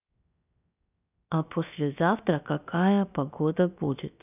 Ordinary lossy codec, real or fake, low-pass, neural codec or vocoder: none; fake; 3.6 kHz; codec, 16 kHz, 0.7 kbps, FocalCodec